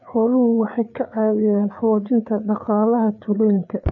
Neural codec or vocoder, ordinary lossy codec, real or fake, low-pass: codec, 16 kHz, 4 kbps, FreqCodec, larger model; none; fake; 7.2 kHz